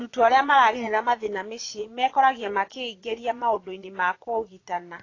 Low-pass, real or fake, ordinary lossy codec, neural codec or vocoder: 7.2 kHz; fake; AAC, 32 kbps; vocoder, 22.05 kHz, 80 mel bands, Vocos